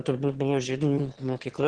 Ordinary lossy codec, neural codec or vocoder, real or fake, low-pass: Opus, 16 kbps; autoencoder, 22.05 kHz, a latent of 192 numbers a frame, VITS, trained on one speaker; fake; 9.9 kHz